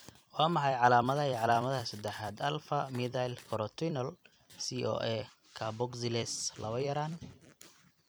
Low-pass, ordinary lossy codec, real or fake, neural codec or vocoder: none; none; fake; vocoder, 44.1 kHz, 128 mel bands every 256 samples, BigVGAN v2